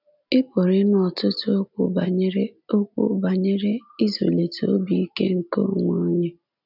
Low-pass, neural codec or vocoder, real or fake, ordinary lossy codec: 5.4 kHz; none; real; none